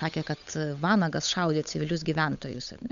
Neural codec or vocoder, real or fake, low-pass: codec, 16 kHz, 8 kbps, FunCodec, trained on Chinese and English, 25 frames a second; fake; 7.2 kHz